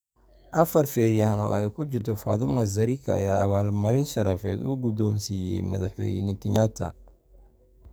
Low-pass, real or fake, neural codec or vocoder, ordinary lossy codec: none; fake; codec, 44.1 kHz, 2.6 kbps, SNAC; none